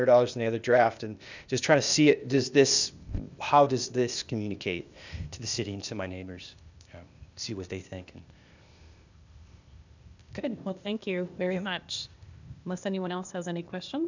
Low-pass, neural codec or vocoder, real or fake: 7.2 kHz; codec, 16 kHz, 0.8 kbps, ZipCodec; fake